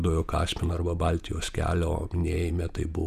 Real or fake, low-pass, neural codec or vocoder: fake; 14.4 kHz; vocoder, 48 kHz, 128 mel bands, Vocos